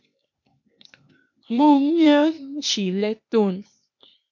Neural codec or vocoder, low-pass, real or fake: codec, 16 kHz, 0.8 kbps, ZipCodec; 7.2 kHz; fake